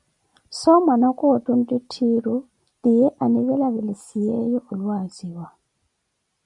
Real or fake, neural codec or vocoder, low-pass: real; none; 10.8 kHz